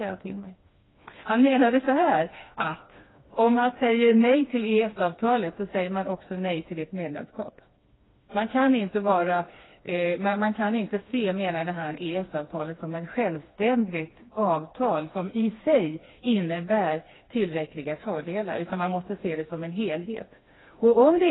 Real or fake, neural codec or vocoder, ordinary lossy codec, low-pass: fake; codec, 16 kHz, 2 kbps, FreqCodec, smaller model; AAC, 16 kbps; 7.2 kHz